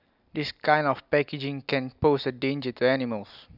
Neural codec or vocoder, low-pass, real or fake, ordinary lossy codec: none; 5.4 kHz; real; none